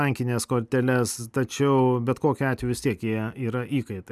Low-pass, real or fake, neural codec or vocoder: 14.4 kHz; real; none